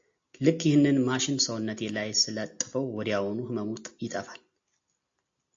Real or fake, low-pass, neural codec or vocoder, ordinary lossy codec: real; 7.2 kHz; none; AAC, 48 kbps